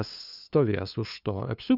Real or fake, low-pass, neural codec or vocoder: fake; 5.4 kHz; codec, 16 kHz, 2 kbps, FunCodec, trained on LibriTTS, 25 frames a second